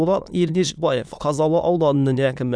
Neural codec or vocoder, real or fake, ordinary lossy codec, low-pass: autoencoder, 22.05 kHz, a latent of 192 numbers a frame, VITS, trained on many speakers; fake; none; none